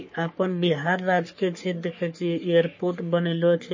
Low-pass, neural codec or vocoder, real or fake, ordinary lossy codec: 7.2 kHz; codec, 44.1 kHz, 3.4 kbps, Pupu-Codec; fake; MP3, 32 kbps